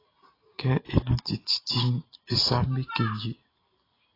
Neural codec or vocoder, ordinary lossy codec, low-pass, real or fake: none; AAC, 24 kbps; 5.4 kHz; real